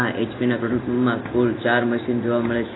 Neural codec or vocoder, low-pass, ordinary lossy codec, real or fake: none; 7.2 kHz; AAC, 16 kbps; real